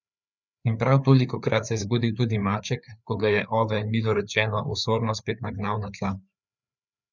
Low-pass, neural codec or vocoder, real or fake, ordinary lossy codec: 7.2 kHz; codec, 16 kHz, 4 kbps, FreqCodec, larger model; fake; none